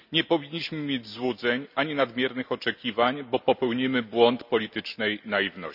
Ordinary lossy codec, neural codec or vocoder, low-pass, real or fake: none; none; 5.4 kHz; real